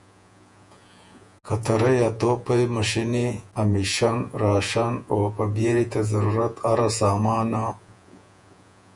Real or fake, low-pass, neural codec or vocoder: fake; 10.8 kHz; vocoder, 48 kHz, 128 mel bands, Vocos